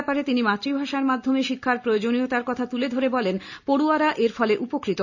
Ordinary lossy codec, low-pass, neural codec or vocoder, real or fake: none; 7.2 kHz; none; real